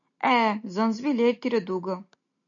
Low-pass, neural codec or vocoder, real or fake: 7.2 kHz; none; real